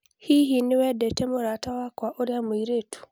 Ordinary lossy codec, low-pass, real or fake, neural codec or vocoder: none; none; real; none